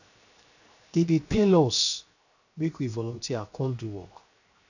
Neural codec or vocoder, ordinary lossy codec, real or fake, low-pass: codec, 16 kHz, 0.7 kbps, FocalCodec; none; fake; 7.2 kHz